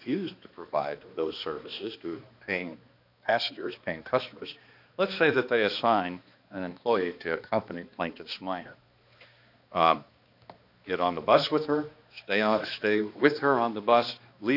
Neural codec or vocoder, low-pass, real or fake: codec, 16 kHz, 2 kbps, X-Codec, HuBERT features, trained on balanced general audio; 5.4 kHz; fake